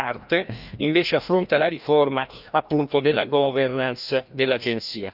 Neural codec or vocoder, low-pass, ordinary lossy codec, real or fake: codec, 16 kHz, 1 kbps, FreqCodec, larger model; 5.4 kHz; none; fake